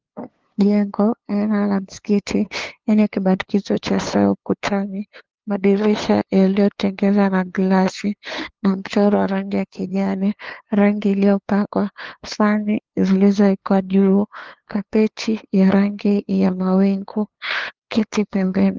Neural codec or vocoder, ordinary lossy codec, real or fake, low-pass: codec, 16 kHz, 2 kbps, FunCodec, trained on LibriTTS, 25 frames a second; Opus, 16 kbps; fake; 7.2 kHz